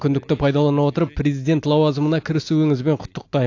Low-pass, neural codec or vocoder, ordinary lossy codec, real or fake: 7.2 kHz; none; AAC, 48 kbps; real